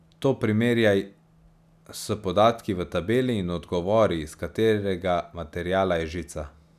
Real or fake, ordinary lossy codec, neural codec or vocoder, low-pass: real; none; none; 14.4 kHz